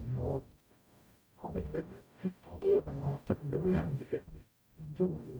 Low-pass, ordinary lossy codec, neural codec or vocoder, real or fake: none; none; codec, 44.1 kHz, 0.9 kbps, DAC; fake